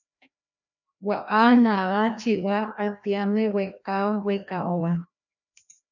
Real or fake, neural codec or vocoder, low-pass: fake; codec, 16 kHz, 1 kbps, FreqCodec, larger model; 7.2 kHz